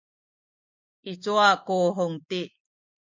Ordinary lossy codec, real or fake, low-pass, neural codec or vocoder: MP3, 48 kbps; real; 7.2 kHz; none